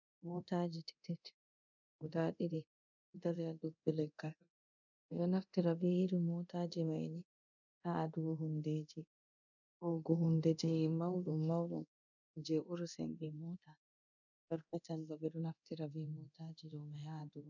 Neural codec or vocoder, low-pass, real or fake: codec, 24 kHz, 0.9 kbps, DualCodec; 7.2 kHz; fake